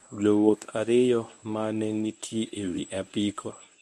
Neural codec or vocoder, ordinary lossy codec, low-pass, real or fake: codec, 24 kHz, 0.9 kbps, WavTokenizer, medium speech release version 1; none; none; fake